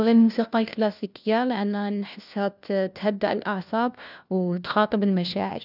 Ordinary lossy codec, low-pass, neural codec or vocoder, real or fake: none; 5.4 kHz; codec, 16 kHz, 1 kbps, FunCodec, trained on LibriTTS, 50 frames a second; fake